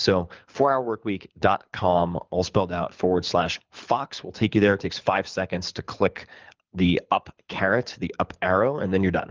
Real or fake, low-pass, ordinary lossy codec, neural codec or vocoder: fake; 7.2 kHz; Opus, 24 kbps; codec, 24 kHz, 6 kbps, HILCodec